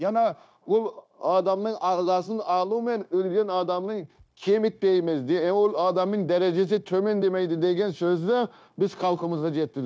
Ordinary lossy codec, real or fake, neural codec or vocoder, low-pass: none; fake; codec, 16 kHz, 0.9 kbps, LongCat-Audio-Codec; none